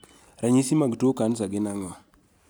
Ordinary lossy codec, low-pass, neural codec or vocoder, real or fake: none; none; none; real